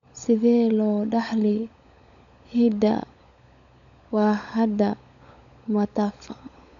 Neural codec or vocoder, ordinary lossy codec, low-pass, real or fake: codec, 16 kHz, 16 kbps, FunCodec, trained on LibriTTS, 50 frames a second; MP3, 96 kbps; 7.2 kHz; fake